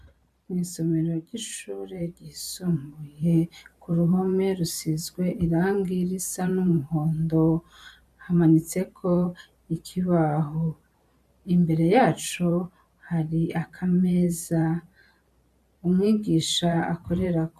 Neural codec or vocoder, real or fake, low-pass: vocoder, 44.1 kHz, 128 mel bands every 512 samples, BigVGAN v2; fake; 14.4 kHz